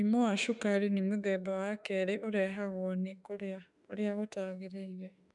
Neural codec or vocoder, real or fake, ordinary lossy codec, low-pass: autoencoder, 48 kHz, 32 numbers a frame, DAC-VAE, trained on Japanese speech; fake; none; 10.8 kHz